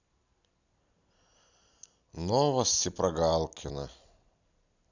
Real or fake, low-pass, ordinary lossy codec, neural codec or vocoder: real; 7.2 kHz; none; none